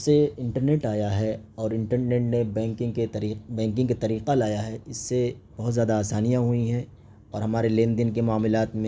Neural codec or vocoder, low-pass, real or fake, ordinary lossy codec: none; none; real; none